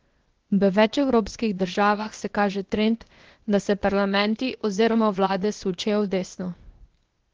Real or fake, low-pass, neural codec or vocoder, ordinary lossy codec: fake; 7.2 kHz; codec, 16 kHz, 0.8 kbps, ZipCodec; Opus, 16 kbps